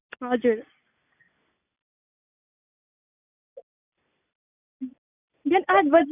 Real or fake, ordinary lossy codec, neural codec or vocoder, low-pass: real; none; none; 3.6 kHz